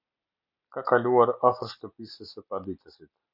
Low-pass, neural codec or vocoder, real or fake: 5.4 kHz; none; real